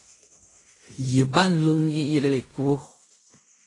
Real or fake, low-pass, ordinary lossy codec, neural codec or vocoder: fake; 10.8 kHz; AAC, 32 kbps; codec, 16 kHz in and 24 kHz out, 0.4 kbps, LongCat-Audio-Codec, fine tuned four codebook decoder